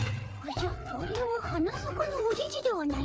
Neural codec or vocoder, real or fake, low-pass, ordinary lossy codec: codec, 16 kHz, 4 kbps, FreqCodec, larger model; fake; none; none